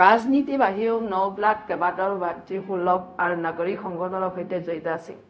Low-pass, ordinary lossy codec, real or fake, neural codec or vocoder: none; none; fake; codec, 16 kHz, 0.4 kbps, LongCat-Audio-Codec